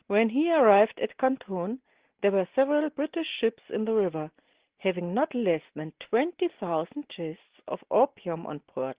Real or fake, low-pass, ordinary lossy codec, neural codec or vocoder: real; 3.6 kHz; Opus, 16 kbps; none